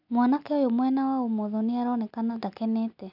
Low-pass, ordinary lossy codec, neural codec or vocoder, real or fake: 5.4 kHz; none; none; real